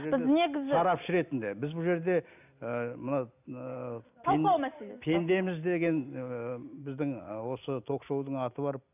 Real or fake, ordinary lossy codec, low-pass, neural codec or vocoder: real; none; 3.6 kHz; none